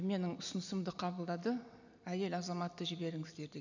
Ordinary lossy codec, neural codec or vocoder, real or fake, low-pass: none; none; real; 7.2 kHz